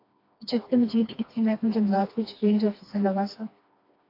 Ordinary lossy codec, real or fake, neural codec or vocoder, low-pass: AAC, 32 kbps; fake; codec, 16 kHz, 2 kbps, FreqCodec, smaller model; 5.4 kHz